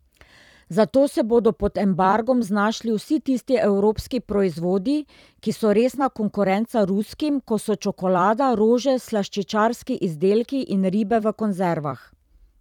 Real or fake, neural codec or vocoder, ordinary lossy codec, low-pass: fake; vocoder, 44.1 kHz, 128 mel bands every 256 samples, BigVGAN v2; none; 19.8 kHz